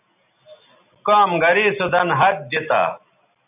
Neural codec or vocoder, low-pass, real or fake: none; 3.6 kHz; real